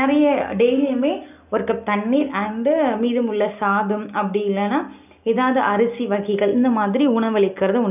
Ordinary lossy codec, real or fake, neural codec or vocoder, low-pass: none; real; none; 3.6 kHz